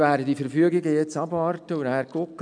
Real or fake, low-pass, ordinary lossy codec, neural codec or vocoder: real; 9.9 kHz; none; none